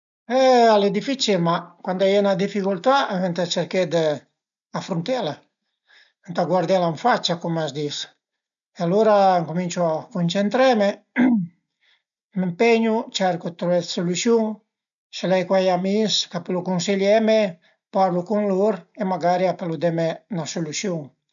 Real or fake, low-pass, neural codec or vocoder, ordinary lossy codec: real; 7.2 kHz; none; none